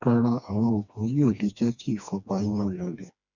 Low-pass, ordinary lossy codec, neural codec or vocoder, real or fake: 7.2 kHz; none; codec, 16 kHz, 2 kbps, FreqCodec, smaller model; fake